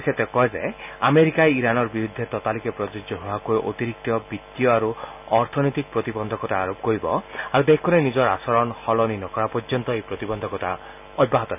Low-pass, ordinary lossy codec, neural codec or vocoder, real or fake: 3.6 kHz; none; none; real